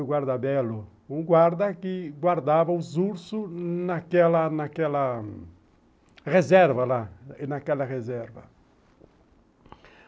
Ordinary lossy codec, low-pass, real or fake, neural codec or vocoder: none; none; real; none